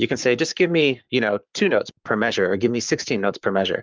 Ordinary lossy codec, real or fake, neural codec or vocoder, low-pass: Opus, 24 kbps; fake; codec, 44.1 kHz, 7.8 kbps, Pupu-Codec; 7.2 kHz